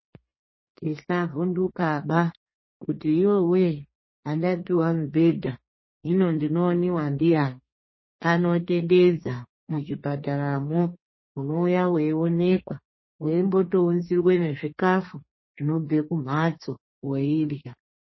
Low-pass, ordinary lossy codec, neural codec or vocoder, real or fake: 7.2 kHz; MP3, 24 kbps; codec, 32 kHz, 1.9 kbps, SNAC; fake